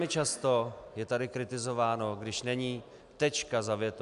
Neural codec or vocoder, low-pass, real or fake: none; 10.8 kHz; real